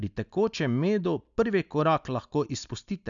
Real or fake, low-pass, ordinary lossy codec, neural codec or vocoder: real; 7.2 kHz; none; none